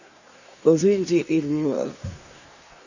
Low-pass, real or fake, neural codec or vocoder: 7.2 kHz; fake; codec, 24 kHz, 1 kbps, SNAC